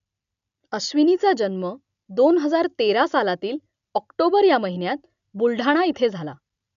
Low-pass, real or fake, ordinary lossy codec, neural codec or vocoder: 7.2 kHz; real; none; none